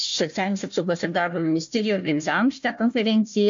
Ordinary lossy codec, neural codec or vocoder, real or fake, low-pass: MP3, 48 kbps; codec, 16 kHz, 1 kbps, FunCodec, trained on Chinese and English, 50 frames a second; fake; 7.2 kHz